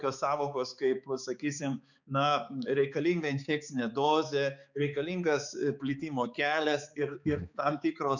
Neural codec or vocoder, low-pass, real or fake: codec, 16 kHz, 4 kbps, X-Codec, HuBERT features, trained on balanced general audio; 7.2 kHz; fake